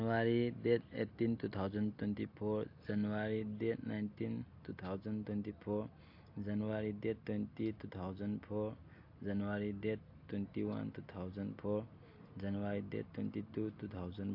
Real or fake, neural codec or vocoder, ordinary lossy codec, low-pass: real; none; AAC, 48 kbps; 5.4 kHz